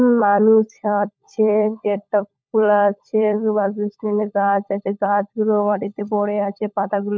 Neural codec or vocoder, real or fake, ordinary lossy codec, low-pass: codec, 16 kHz, 16 kbps, FunCodec, trained on LibriTTS, 50 frames a second; fake; none; none